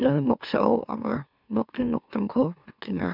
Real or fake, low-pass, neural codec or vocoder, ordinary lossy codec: fake; 5.4 kHz; autoencoder, 44.1 kHz, a latent of 192 numbers a frame, MeloTTS; none